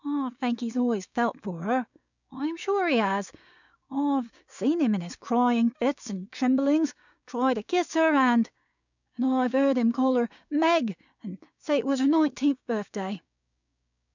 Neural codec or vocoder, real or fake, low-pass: codec, 16 kHz, 6 kbps, DAC; fake; 7.2 kHz